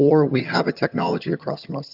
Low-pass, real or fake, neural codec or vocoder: 5.4 kHz; fake; vocoder, 22.05 kHz, 80 mel bands, HiFi-GAN